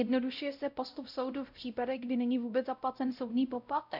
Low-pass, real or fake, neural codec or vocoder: 5.4 kHz; fake; codec, 16 kHz, 0.5 kbps, X-Codec, WavLM features, trained on Multilingual LibriSpeech